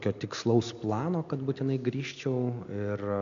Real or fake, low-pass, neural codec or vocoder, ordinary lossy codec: real; 7.2 kHz; none; AAC, 64 kbps